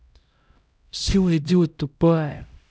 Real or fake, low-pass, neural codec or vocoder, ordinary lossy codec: fake; none; codec, 16 kHz, 0.5 kbps, X-Codec, HuBERT features, trained on LibriSpeech; none